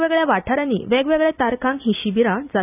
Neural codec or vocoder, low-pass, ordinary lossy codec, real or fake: none; 3.6 kHz; none; real